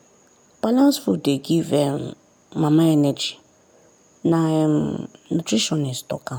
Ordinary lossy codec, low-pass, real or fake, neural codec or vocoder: none; none; real; none